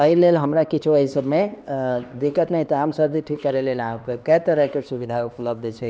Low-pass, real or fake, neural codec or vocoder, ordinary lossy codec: none; fake; codec, 16 kHz, 2 kbps, X-Codec, HuBERT features, trained on LibriSpeech; none